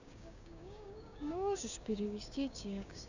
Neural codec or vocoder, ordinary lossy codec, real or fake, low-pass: codec, 16 kHz, 6 kbps, DAC; none; fake; 7.2 kHz